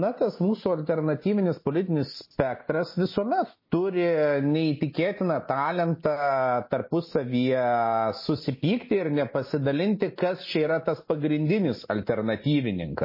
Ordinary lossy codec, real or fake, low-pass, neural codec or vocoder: MP3, 24 kbps; real; 5.4 kHz; none